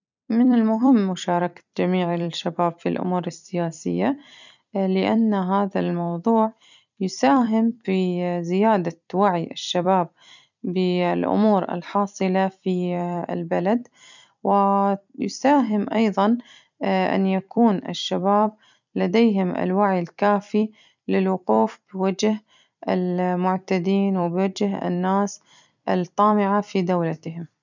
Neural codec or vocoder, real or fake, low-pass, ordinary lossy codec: vocoder, 44.1 kHz, 128 mel bands every 256 samples, BigVGAN v2; fake; 7.2 kHz; none